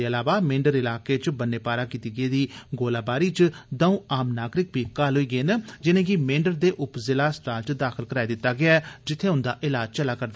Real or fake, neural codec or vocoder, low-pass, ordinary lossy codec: real; none; none; none